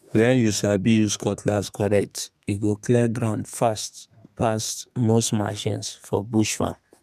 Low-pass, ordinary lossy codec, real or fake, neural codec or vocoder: 14.4 kHz; none; fake; codec, 32 kHz, 1.9 kbps, SNAC